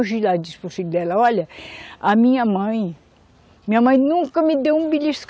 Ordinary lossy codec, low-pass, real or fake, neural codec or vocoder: none; none; real; none